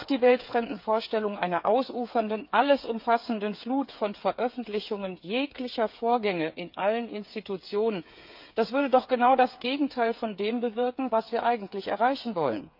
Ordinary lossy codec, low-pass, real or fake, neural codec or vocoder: none; 5.4 kHz; fake; codec, 16 kHz, 8 kbps, FreqCodec, smaller model